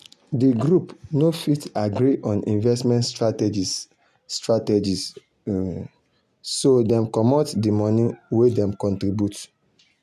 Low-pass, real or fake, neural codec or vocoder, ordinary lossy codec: 14.4 kHz; real; none; none